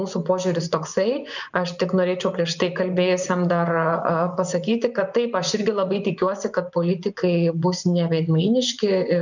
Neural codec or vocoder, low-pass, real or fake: vocoder, 24 kHz, 100 mel bands, Vocos; 7.2 kHz; fake